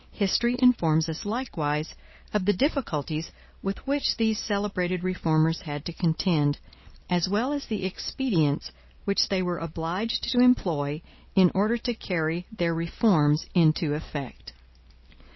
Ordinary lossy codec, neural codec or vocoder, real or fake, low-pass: MP3, 24 kbps; none; real; 7.2 kHz